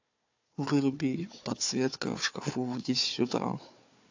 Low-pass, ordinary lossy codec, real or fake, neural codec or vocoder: 7.2 kHz; AAC, 48 kbps; fake; codec, 16 kHz, 4 kbps, FunCodec, trained on Chinese and English, 50 frames a second